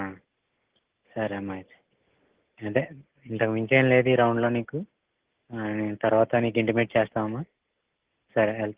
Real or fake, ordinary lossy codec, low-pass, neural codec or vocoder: real; Opus, 16 kbps; 3.6 kHz; none